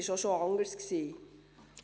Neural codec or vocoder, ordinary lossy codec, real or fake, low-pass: none; none; real; none